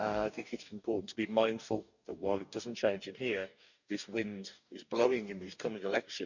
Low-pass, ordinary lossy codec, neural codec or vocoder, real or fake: 7.2 kHz; none; codec, 44.1 kHz, 2.6 kbps, DAC; fake